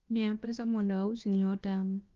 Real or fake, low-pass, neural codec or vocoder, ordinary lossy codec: fake; 7.2 kHz; codec, 16 kHz, about 1 kbps, DyCAST, with the encoder's durations; Opus, 32 kbps